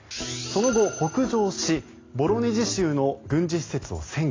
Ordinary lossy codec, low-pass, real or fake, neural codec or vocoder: AAC, 32 kbps; 7.2 kHz; real; none